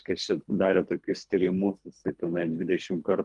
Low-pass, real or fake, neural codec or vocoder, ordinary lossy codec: 10.8 kHz; fake; codec, 24 kHz, 3 kbps, HILCodec; Opus, 32 kbps